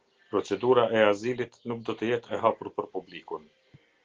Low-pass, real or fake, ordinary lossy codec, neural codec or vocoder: 7.2 kHz; real; Opus, 16 kbps; none